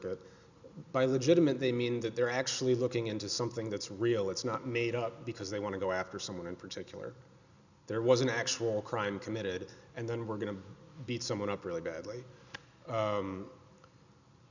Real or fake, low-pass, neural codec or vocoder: real; 7.2 kHz; none